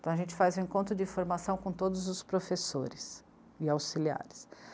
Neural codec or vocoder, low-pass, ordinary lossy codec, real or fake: none; none; none; real